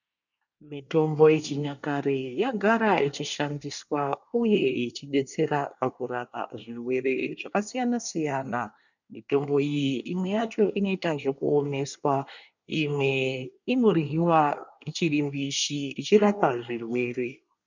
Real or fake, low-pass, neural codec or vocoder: fake; 7.2 kHz; codec, 24 kHz, 1 kbps, SNAC